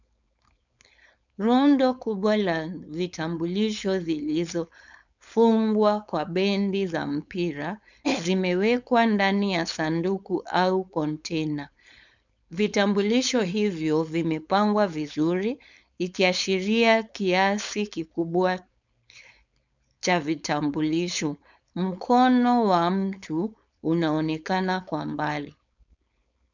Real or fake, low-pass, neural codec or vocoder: fake; 7.2 kHz; codec, 16 kHz, 4.8 kbps, FACodec